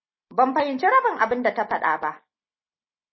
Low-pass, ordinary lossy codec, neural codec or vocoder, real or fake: 7.2 kHz; MP3, 24 kbps; none; real